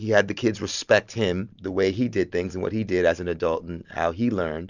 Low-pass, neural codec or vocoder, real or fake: 7.2 kHz; none; real